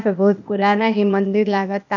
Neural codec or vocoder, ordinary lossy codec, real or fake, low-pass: codec, 16 kHz, 0.8 kbps, ZipCodec; none; fake; 7.2 kHz